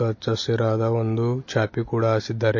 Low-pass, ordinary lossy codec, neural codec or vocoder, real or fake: 7.2 kHz; MP3, 32 kbps; none; real